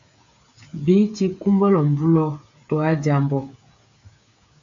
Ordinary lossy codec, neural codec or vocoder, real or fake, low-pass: AAC, 64 kbps; codec, 16 kHz, 8 kbps, FreqCodec, smaller model; fake; 7.2 kHz